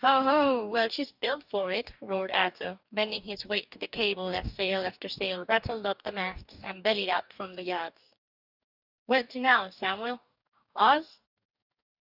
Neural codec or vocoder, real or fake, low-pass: codec, 44.1 kHz, 2.6 kbps, DAC; fake; 5.4 kHz